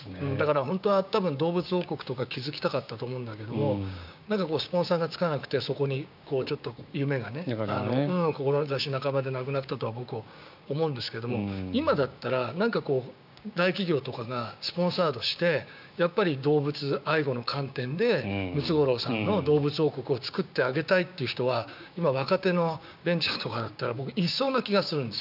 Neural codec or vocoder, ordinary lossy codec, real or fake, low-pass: codec, 16 kHz, 6 kbps, DAC; none; fake; 5.4 kHz